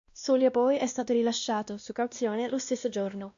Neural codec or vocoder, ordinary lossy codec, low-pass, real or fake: codec, 16 kHz, 1 kbps, X-Codec, WavLM features, trained on Multilingual LibriSpeech; AAC, 64 kbps; 7.2 kHz; fake